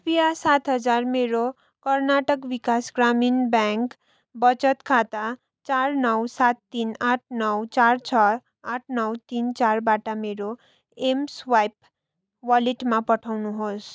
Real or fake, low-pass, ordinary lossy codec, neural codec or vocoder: real; none; none; none